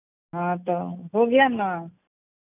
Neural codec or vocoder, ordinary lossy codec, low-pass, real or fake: none; none; 3.6 kHz; real